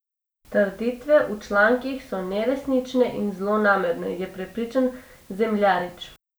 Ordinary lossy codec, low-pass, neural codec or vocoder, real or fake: none; none; none; real